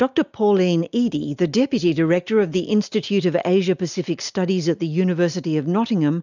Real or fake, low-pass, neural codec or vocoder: real; 7.2 kHz; none